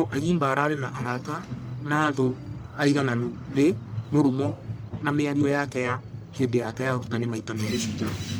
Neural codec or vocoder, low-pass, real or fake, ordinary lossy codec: codec, 44.1 kHz, 1.7 kbps, Pupu-Codec; none; fake; none